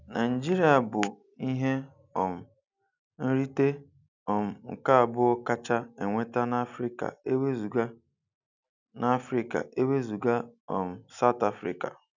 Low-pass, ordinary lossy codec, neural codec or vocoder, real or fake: 7.2 kHz; none; none; real